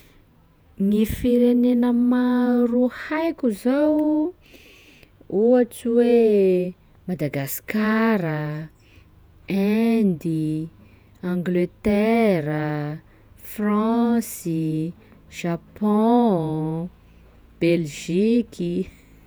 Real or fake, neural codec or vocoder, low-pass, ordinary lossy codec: fake; vocoder, 48 kHz, 128 mel bands, Vocos; none; none